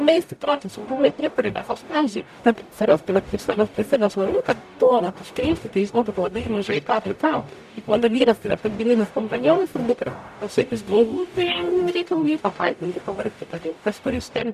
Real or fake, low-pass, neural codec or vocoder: fake; 14.4 kHz; codec, 44.1 kHz, 0.9 kbps, DAC